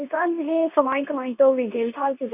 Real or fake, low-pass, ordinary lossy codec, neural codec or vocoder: fake; 3.6 kHz; none; codec, 24 kHz, 0.9 kbps, WavTokenizer, medium speech release version 2